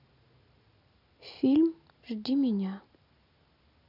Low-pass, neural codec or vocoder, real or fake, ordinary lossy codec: 5.4 kHz; none; real; none